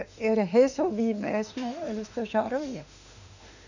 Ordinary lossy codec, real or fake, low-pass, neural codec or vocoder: none; fake; 7.2 kHz; autoencoder, 48 kHz, 32 numbers a frame, DAC-VAE, trained on Japanese speech